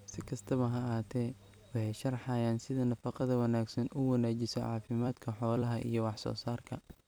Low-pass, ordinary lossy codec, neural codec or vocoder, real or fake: none; none; vocoder, 44.1 kHz, 128 mel bands every 256 samples, BigVGAN v2; fake